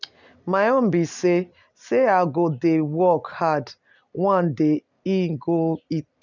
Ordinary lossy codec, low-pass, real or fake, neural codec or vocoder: none; 7.2 kHz; real; none